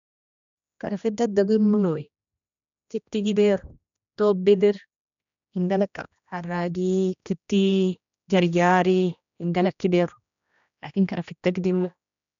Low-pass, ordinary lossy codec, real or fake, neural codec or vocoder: 7.2 kHz; none; fake; codec, 16 kHz, 1 kbps, X-Codec, HuBERT features, trained on general audio